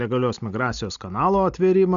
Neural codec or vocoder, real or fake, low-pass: none; real; 7.2 kHz